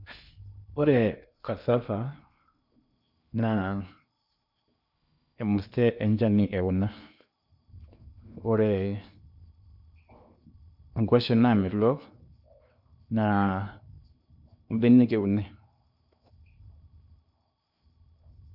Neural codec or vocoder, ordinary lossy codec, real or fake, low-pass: codec, 16 kHz in and 24 kHz out, 0.8 kbps, FocalCodec, streaming, 65536 codes; none; fake; 5.4 kHz